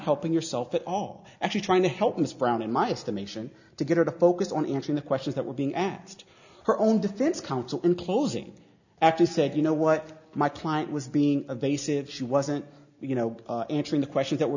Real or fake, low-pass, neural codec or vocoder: real; 7.2 kHz; none